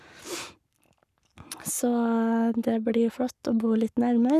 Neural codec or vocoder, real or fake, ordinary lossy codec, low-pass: codec, 44.1 kHz, 7.8 kbps, Pupu-Codec; fake; none; 14.4 kHz